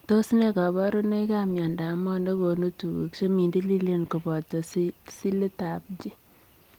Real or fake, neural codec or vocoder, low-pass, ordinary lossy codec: real; none; 19.8 kHz; Opus, 24 kbps